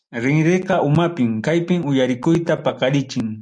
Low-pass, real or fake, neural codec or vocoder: 9.9 kHz; real; none